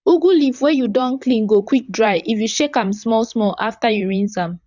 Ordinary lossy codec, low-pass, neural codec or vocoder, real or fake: none; 7.2 kHz; vocoder, 44.1 kHz, 128 mel bands, Pupu-Vocoder; fake